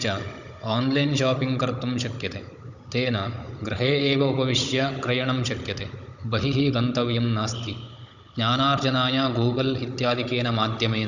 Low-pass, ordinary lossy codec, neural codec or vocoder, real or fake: 7.2 kHz; none; codec, 16 kHz, 16 kbps, FunCodec, trained on Chinese and English, 50 frames a second; fake